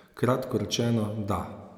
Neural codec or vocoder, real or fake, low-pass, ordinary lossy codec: none; real; 19.8 kHz; none